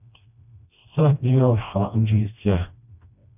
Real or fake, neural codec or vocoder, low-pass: fake; codec, 16 kHz, 1 kbps, FreqCodec, smaller model; 3.6 kHz